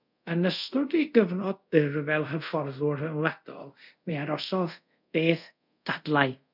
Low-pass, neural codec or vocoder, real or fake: 5.4 kHz; codec, 24 kHz, 0.5 kbps, DualCodec; fake